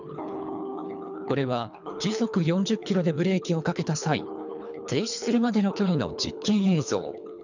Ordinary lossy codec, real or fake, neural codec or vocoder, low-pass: none; fake; codec, 24 kHz, 3 kbps, HILCodec; 7.2 kHz